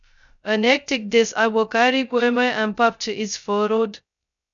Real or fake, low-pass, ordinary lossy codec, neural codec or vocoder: fake; 7.2 kHz; none; codec, 16 kHz, 0.2 kbps, FocalCodec